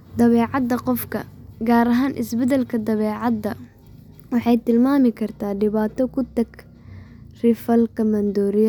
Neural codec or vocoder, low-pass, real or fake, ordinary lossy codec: none; 19.8 kHz; real; none